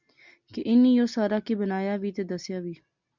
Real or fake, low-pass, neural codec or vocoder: real; 7.2 kHz; none